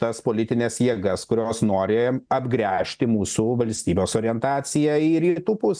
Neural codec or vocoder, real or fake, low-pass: none; real; 9.9 kHz